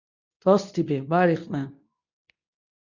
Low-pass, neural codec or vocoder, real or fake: 7.2 kHz; codec, 24 kHz, 0.9 kbps, WavTokenizer, medium speech release version 2; fake